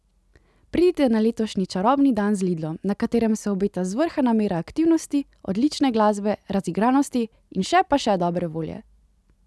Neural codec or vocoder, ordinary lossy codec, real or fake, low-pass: none; none; real; none